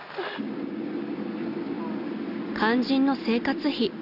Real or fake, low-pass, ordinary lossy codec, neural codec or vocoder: real; 5.4 kHz; none; none